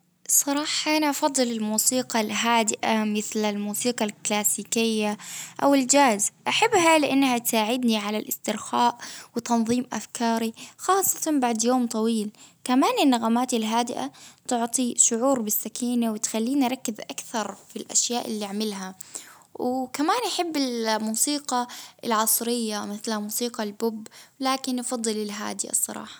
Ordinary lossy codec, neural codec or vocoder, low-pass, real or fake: none; none; none; real